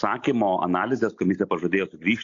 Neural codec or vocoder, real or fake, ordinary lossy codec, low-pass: none; real; AAC, 48 kbps; 7.2 kHz